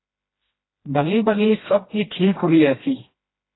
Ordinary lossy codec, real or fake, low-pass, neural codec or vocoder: AAC, 16 kbps; fake; 7.2 kHz; codec, 16 kHz, 1 kbps, FreqCodec, smaller model